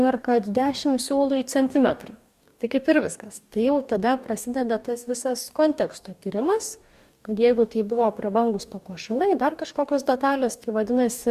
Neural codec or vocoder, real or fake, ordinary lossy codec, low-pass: codec, 44.1 kHz, 2.6 kbps, DAC; fake; Opus, 64 kbps; 14.4 kHz